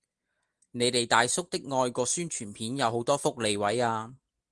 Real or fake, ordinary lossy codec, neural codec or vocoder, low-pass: real; Opus, 24 kbps; none; 10.8 kHz